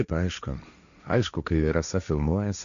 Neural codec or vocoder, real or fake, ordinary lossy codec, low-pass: codec, 16 kHz, 1.1 kbps, Voila-Tokenizer; fake; MP3, 96 kbps; 7.2 kHz